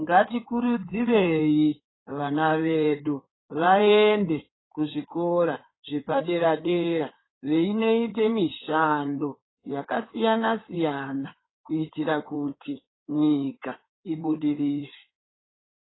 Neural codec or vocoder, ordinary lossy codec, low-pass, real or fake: codec, 16 kHz in and 24 kHz out, 2.2 kbps, FireRedTTS-2 codec; AAC, 16 kbps; 7.2 kHz; fake